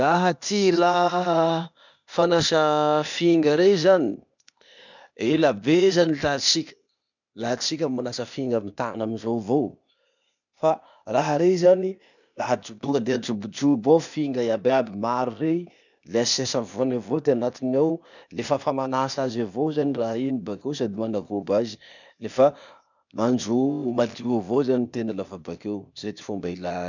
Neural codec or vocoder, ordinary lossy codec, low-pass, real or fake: codec, 16 kHz, 0.8 kbps, ZipCodec; none; 7.2 kHz; fake